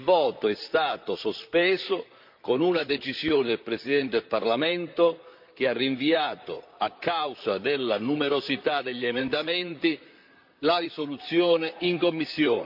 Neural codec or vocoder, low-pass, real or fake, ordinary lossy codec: vocoder, 44.1 kHz, 128 mel bands, Pupu-Vocoder; 5.4 kHz; fake; MP3, 48 kbps